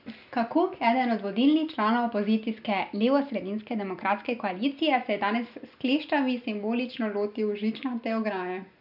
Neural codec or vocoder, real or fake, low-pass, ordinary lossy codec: none; real; 5.4 kHz; none